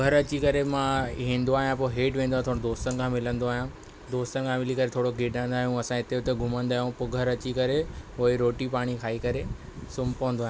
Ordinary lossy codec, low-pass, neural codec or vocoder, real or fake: none; none; none; real